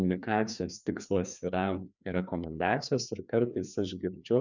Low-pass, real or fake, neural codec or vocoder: 7.2 kHz; fake; codec, 16 kHz, 2 kbps, FreqCodec, larger model